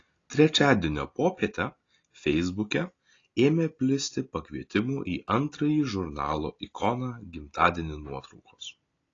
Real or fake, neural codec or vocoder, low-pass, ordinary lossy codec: real; none; 7.2 kHz; AAC, 32 kbps